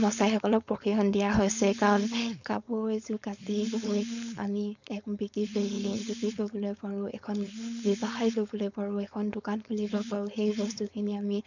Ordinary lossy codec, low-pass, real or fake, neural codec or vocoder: none; 7.2 kHz; fake; codec, 16 kHz, 4.8 kbps, FACodec